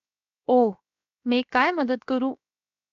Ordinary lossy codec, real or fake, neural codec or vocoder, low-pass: AAC, 64 kbps; fake; codec, 16 kHz, 0.7 kbps, FocalCodec; 7.2 kHz